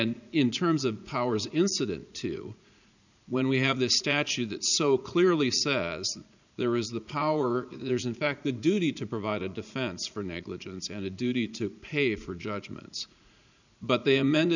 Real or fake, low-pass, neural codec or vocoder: fake; 7.2 kHz; vocoder, 44.1 kHz, 80 mel bands, Vocos